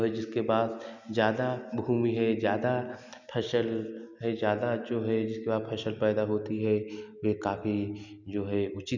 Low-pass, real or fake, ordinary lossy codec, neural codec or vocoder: 7.2 kHz; real; none; none